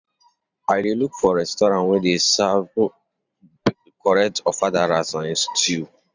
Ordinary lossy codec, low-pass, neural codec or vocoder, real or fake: none; 7.2 kHz; vocoder, 44.1 kHz, 128 mel bands every 512 samples, BigVGAN v2; fake